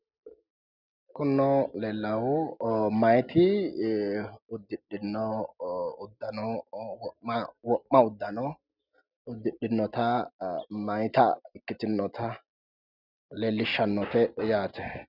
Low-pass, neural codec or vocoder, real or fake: 5.4 kHz; none; real